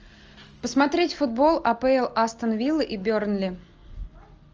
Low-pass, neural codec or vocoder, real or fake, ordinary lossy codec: 7.2 kHz; none; real; Opus, 24 kbps